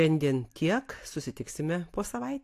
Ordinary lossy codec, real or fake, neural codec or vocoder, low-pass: AAC, 64 kbps; real; none; 14.4 kHz